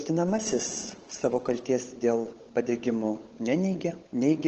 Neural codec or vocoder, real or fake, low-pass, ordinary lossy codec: codec, 16 kHz, 16 kbps, FunCodec, trained on LibriTTS, 50 frames a second; fake; 7.2 kHz; Opus, 16 kbps